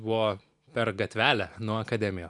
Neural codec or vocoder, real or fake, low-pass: none; real; 10.8 kHz